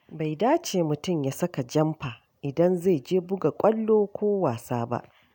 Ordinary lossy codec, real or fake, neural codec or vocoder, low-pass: none; real; none; 19.8 kHz